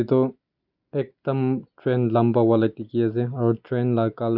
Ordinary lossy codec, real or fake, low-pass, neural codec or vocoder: none; real; 5.4 kHz; none